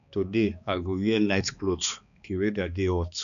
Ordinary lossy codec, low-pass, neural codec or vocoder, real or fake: none; 7.2 kHz; codec, 16 kHz, 2 kbps, X-Codec, HuBERT features, trained on balanced general audio; fake